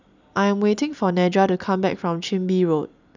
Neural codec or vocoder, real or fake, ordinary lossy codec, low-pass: none; real; none; 7.2 kHz